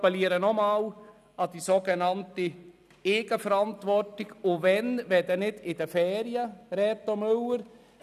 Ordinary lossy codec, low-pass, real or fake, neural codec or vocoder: none; 14.4 kHz; real; none